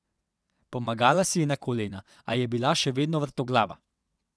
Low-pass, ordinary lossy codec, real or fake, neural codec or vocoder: none; none; fake; vocoder, 22.05 kHz, 80 mel bands, WaveNeXt